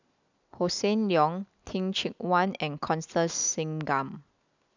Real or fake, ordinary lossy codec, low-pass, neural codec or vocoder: real; none; 7.2 kHz; none